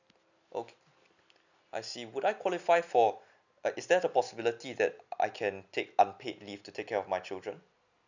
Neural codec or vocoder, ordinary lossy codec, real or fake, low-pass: none; none; real; 7.2 kHz